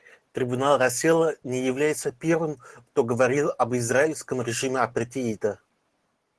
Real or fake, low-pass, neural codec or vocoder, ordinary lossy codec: real; 10.8 kHz; none; Opus, 16 kbps